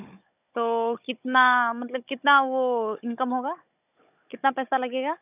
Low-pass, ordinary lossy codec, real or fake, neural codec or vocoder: 3.6 kHz; none; fake; codec, 16 kHz, 16 kbps, FunCodec, trained on Chinese and English, 50 frames a second